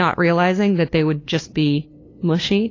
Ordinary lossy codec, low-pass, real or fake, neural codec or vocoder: AAC, 32 kbps; 7.2 kHz; fake; codec, 16 kHz, 2 kbps, FunCodec, trained on LibriTTS, 25 frames a second